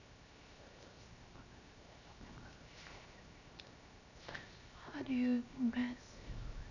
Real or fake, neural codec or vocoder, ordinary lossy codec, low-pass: fake; codec, 16 kHz, 0.7 kbps, FocalCodec; none; 7.2 kHz